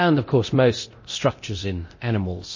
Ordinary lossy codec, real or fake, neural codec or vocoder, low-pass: MP3, 32 kbps; fake; codec, 24 kHz, 0.9 kbps, DualCodec; 7.2 kHz